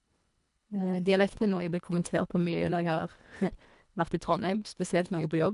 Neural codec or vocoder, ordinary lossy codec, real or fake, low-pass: codec, 24 kHz, 1.5 kbps, HILCodec; none; fake; 10.8 kHz